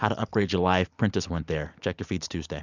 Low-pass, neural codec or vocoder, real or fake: 7.2 kHz; none; real